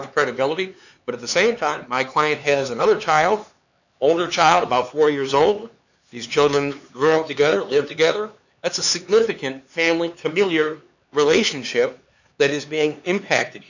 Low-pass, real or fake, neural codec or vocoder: 7.2 kHz; fake; codec, 16 kHz, 4 kbps, X-Codec, HuBERT features, trained on LibriSpeech